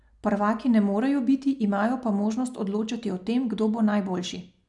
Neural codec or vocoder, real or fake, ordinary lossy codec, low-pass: none; real; none; 10.8 kHz